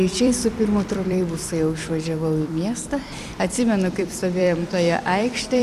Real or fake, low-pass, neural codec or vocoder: fake; 14.4 kHz; vocoder, 44.1 kHz, 128 mel bands every 256 samples, BigVGAN v2